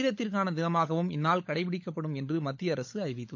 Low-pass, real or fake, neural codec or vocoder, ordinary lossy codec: 7.2 kHz; fake; codec, 16 kHz, 16 kbps, FunCodec, trained on LibriTTS, 50 frames a second; AAC, 48 kbps